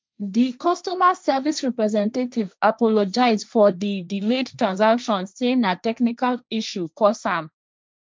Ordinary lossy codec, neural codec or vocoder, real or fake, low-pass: none; codec, 16 kHz, 1.1 kbps, Voila-Tokenizer; fake; none